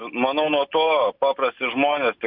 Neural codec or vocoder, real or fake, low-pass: none; real; 5.4 kHz